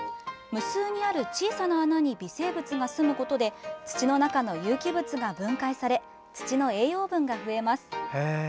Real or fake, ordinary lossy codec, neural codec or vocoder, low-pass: real; none; none; none